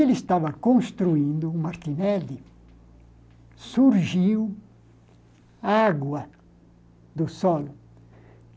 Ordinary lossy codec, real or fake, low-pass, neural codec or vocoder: none; real; none; none